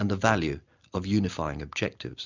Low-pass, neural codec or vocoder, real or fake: 7.2 kHz; none; real